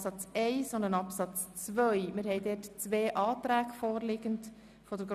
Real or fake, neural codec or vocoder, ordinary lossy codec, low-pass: real; none; none; 14.4 kHz